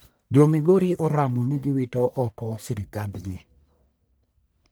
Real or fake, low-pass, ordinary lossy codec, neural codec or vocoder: fake; none; none; codec, 44.1 kHz, 1.7 kbps, Pupu-Codec